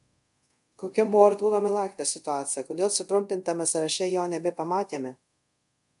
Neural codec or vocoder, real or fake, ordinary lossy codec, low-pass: codec, 24 kHz, 0.5 kbps, DualCodec; fake; MP3, 64 kbps; 10.8 kHz